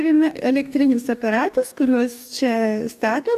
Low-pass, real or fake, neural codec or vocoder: 14.4 kHz; fake; codec, 44.1 kHz, 2.6 kbps, DAC